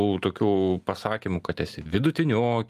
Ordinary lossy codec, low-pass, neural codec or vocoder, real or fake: Opus, 32 kbps; 14.4 kHz; none; real